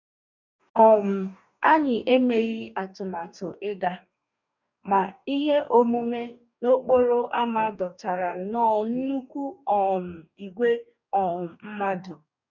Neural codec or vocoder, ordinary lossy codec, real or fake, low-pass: codec, 44.1 kHz, 2.6 kbps, DAC; none; fake; 7.2 kHz